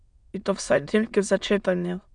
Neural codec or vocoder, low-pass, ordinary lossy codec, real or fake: autoencoder, 22.05 kHz, a latent of 192 numbers a frame, VITS, trained on many speakers; 9.9 kHz; Opus, 64 kbps; fake